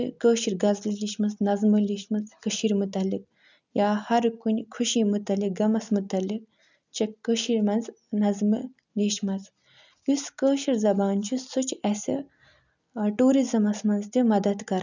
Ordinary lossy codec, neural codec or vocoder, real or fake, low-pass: none; none; real; 7.2 kHz